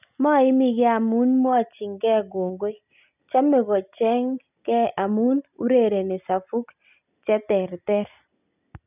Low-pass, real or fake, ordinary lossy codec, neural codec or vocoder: 3.6 kHz; real; none; none